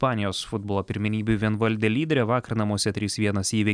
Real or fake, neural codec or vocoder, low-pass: real; none; 9.9 kHz